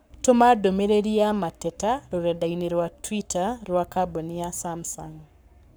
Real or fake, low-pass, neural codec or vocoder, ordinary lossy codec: fake; none; codec, 44.1 kHz, 7.8 kbps, Pupu-Codec; none